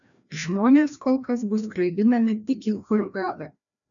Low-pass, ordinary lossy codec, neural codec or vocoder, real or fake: 7.2 kHz; MP3, 96 kbps; codec, 16 kHz, 1 kbps, FreqCodec, larger model; fake